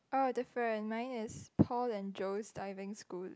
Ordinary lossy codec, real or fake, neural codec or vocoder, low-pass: none; real; none; none